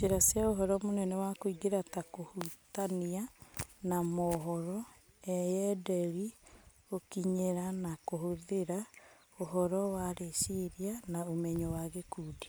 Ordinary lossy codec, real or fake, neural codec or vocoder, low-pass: none; real; none; none